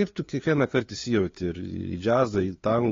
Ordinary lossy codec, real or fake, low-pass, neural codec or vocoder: AAC, 32 kbps; fake; 7.2 kHz; codec, 16 kHz, 2 kbps, FunCodec, trained on LibriTTS, 25 frames a second